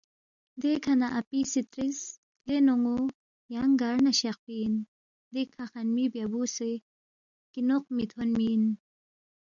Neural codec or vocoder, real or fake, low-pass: none; real; 7.2 kHz